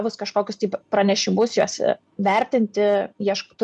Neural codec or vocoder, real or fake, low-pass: none; real; 10.8 kHz